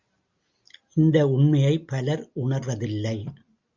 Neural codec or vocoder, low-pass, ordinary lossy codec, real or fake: none; 7.2 kHz; Opus, 64 kbps; real